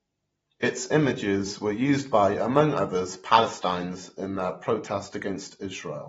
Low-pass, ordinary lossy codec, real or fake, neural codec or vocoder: 19.8 kHz; AAC, 24 kbps; fake; vocoder, 44.1 kHz, 128 mel bands every 512 samples, BigVGAN v2